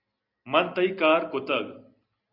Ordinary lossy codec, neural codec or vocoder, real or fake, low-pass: Opus, 64 kbps; none; real; 5.4 kHz